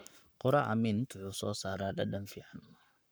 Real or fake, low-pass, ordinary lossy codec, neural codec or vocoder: fake; none; none; codec, 44.1 kHz, 7.8 kbps, Pupu-Codec